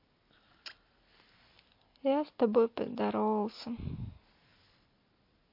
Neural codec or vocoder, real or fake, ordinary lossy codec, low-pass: none; real; MP3, 32 kbps; 5.4 kHz